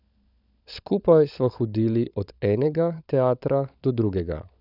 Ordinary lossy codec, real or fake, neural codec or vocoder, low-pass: none; fake; codec, 16 kHz, 16 kbps, FunCodec, trained on LibriTTS, 50 frames a second; 5.4 kHz